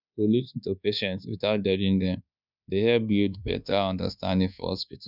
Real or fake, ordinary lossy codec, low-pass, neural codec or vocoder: fake; none; 5.4 kHz; codec, 24 kHz, 1.2 kbps, DualCodec